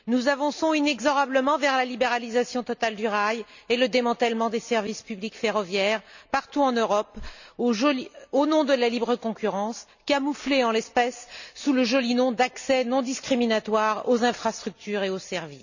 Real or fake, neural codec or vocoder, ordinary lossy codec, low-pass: real; none; none; 7.2 kHz